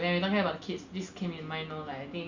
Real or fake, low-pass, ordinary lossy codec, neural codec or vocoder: real; 7.2 kHz; Opus, 64 kbps; none